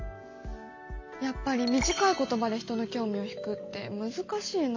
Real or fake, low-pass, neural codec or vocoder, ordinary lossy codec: real; 7.2 kHz; none; AAC, 48 kbps